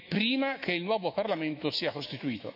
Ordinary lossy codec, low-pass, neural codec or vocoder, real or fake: none; 5.4 kHz; autoencoder, 48 kHz, 128 numbers a frame, DAC-VAE, trained on Japanese speech; fake